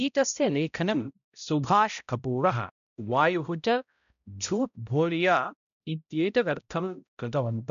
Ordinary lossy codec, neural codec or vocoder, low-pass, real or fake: none; codec, 16 kHz, 0.5 kbps, X-Codec, HuBERT features, trained on balanced general audio; 7.2 kHz; fake